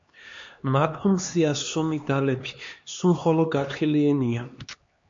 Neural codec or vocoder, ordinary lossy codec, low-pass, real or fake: codec, 16 kHz, 2 kbps, X-Codec, HuBERT features, trained on LibriSpeech; MP3, 48 kbps; 7.2 kHz; fake